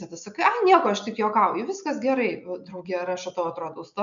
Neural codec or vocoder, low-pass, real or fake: none; 7.2 kHz; real